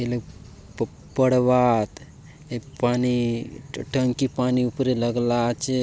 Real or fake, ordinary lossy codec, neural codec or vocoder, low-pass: real; none; none; none